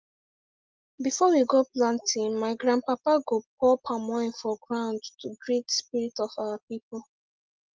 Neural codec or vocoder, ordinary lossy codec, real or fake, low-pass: none; Opus, 32 kbps; real; 7.2 kHz